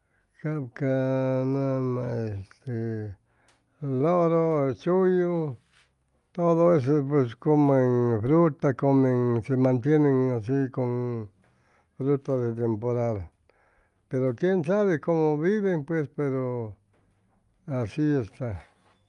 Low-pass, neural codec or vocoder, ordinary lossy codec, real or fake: 10.8 kHz; none; Opus, 32 kbps; real